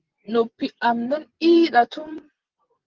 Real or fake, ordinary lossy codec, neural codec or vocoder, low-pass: fake; Opus, 16 kbps; vocoder, 24 kHz, 100 mel bands, Vocos; 7.2 kHz